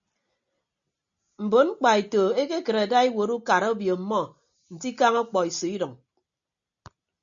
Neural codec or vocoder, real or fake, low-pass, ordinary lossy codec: none; real; 7.2 kHz; MP3, 64 kbps